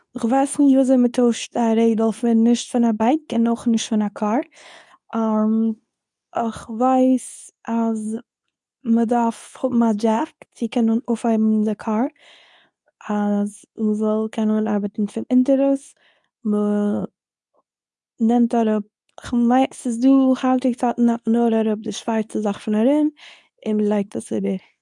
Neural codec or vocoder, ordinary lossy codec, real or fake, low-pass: codec, 24 kHz, 0.9 kbps, WavTokenizer, medium speech release version 2; none; fake; 10.8 kHz